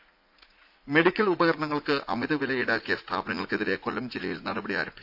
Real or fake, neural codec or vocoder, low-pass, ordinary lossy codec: fake; vocoder, 44.1 kHz, 80 mel bands, Vocos; 5.4 kHz; AAC, 48 kbps